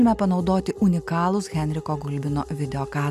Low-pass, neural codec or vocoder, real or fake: 14.4 kHz; none; real